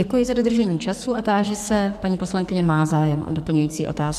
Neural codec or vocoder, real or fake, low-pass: codec, 44.1 kHz, 2.6 kbps, SNAC; fake; 14.4 kHz